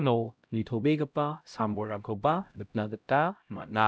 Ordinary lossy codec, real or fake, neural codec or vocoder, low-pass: none; fake; codec, 16 kHz, 0.5 kbps, X-Codec, HuBERT features, trained on LibriSpeech; none